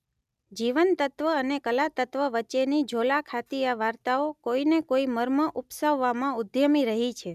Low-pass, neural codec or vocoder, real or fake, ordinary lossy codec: 14.4 kHz; none; real; none